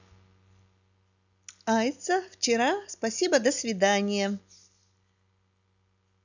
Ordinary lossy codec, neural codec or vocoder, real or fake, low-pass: none; none; real; 7.2 kHz